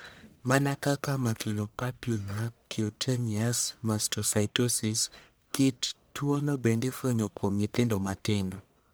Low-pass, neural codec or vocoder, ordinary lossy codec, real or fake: none; codec, 44.1 kHz, 1.7 kbps, Pupu-Codec; none; fake